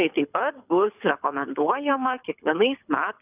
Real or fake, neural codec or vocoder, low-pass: fake; codec, 24 kHz, 6 kbps, HILCodec; 3.6 kHz